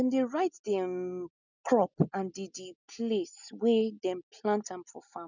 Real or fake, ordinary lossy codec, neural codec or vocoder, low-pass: real; none; none; 7.2 kHz